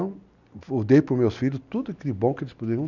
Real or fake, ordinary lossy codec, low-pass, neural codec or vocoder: real; none; 7.2 kHz; none